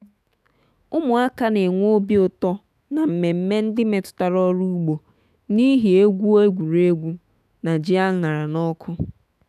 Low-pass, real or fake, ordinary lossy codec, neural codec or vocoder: 14.4 kHz; fake; none; autoencoder, 48 kHz, 128 numbers a frame, DAC-VAE, trained on Japanese speech